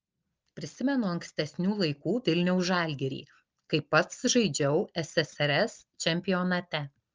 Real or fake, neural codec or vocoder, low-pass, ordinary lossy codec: real; none; 7.2 kHz; Opus, 24 kbps